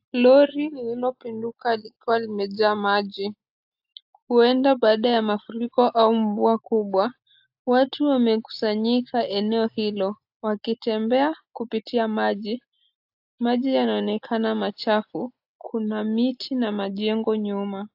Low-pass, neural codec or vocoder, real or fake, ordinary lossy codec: 5.4 kHz; none; real; AAC, 48 kbps